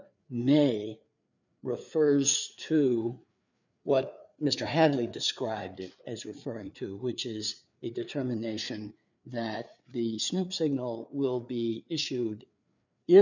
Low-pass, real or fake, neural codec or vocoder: 7.2 kHz; fake; codec, 16 kHz, 4 kbps, FreqCodec, larger model